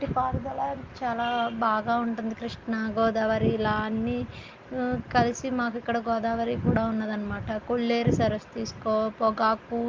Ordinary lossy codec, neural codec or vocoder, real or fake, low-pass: Opus, 32 kbps; none; real; 7.2 kHz